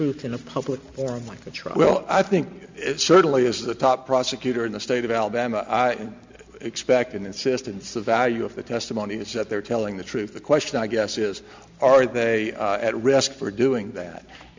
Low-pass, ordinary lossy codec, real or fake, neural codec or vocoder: 7.2 kHz; MP3, 64 kbps; real; none